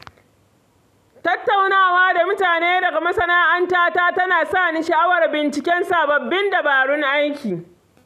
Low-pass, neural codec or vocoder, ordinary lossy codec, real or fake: 14.4 kHz; none; none; real